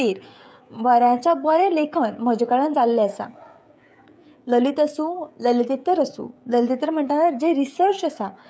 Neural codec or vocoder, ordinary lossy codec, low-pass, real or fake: codec, 16 kHz, 16 kbps, FreqCodec, smaller model; none; none; fake